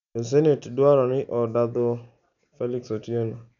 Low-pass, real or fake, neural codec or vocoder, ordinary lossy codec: 7.2 kHz; real; none; none